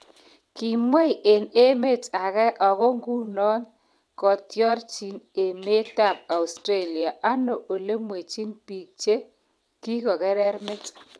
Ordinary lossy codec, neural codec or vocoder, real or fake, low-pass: none; vocoder, 22.05 kHz, 80 mel bands, WaveNeXt; fake; none